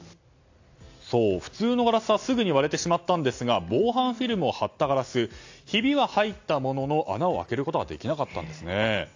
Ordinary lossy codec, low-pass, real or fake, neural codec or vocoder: AAC, 48 kbps; 7.2 kHz; real; none